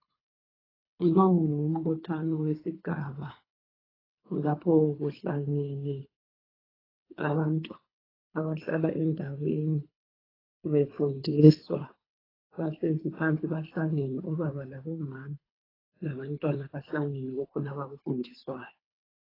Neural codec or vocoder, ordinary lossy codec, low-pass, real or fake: codec, 24 kHz, 3 kbps, HILCodec; AAC, 24 kbps; 5.4 kHz; fake